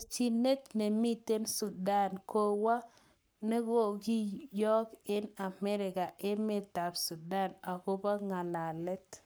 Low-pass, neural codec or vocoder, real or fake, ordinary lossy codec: none; codec, 44.1 kHz, 7.8 kbps, Pupu-Codec; fake; none